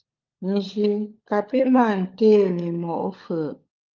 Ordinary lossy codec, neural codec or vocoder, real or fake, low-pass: Opus, 24 kbps; codec, 16 kHz, 16 kbps, FunCodec, trained on LibriTTS, 50 frames a second; fake; 7.2 kHz